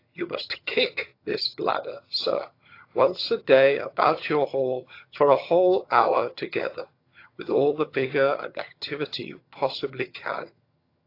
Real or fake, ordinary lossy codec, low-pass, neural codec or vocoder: fake; AAC, 32 kbps; 5.4 kHz; vocoder, 22.05 kHz, 80 mel bands, HiFi-GAN